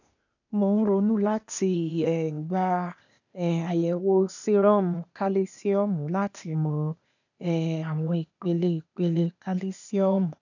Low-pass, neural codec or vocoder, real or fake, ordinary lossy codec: 7.2 kHz; codec, 16 kHz, 0.8 kbps, ZipCodec; fake; none